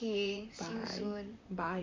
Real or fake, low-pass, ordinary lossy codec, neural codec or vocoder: fake; 7.2 kHz; MP3, 48 kbps; vocoder, 44.1 kHz, 128 mel bands, Pupu-Vocoder